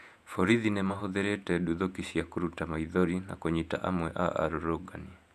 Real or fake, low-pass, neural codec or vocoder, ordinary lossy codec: fake; 14.4 kHz; vocoder, 48 kHz, 128 mel bands, Vocos; none